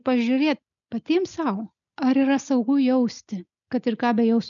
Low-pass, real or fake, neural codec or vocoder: 7.2 kHz; real; none